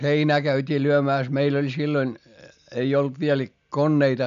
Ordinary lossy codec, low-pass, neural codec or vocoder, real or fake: none; 7.2 kHz; none; real